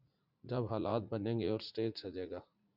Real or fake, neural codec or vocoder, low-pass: fake; vocoder, 44.1 kHz, 80 mel bands, Vocos; 5.4 kHz